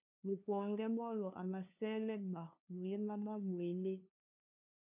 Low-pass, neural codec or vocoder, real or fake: 3.6 kHz; codec, 16 kHz, 2 kbps, FunCodec, trained on LibriTTS, 25 frames a second; fake